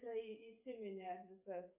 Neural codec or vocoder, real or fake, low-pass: codec, 16 kHz, 8 kbps, FreqCodec, smaller model; fake; 3.6 kHz